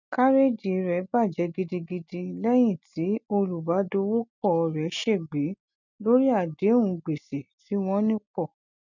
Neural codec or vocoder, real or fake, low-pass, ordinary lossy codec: none; real; 7.2 kHz; none